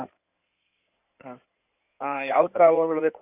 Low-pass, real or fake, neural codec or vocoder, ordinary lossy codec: 3.6 kHz; fake; codec, 16 kHz in and 24 kHz out, 2.2 kbps, FireRedTTS-2 codec; none